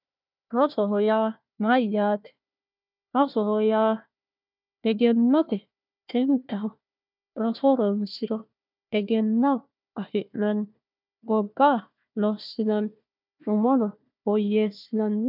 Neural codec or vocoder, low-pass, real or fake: codec, 16 kHz, 1 kbps, FunCodec, trained on Chinese and English, 50 frames a second; 5.4 kHz; fake